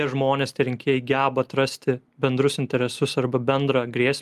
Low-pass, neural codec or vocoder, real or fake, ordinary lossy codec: 14.4 kHz; none; real; Opus, 32 kbps